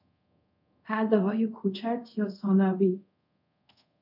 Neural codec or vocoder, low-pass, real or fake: codec, 24 kHz, 0.5 kbps, DualCodec; 5.4 kHz; fake